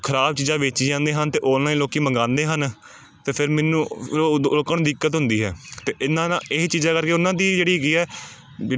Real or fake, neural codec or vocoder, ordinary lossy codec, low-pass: real; none; none; none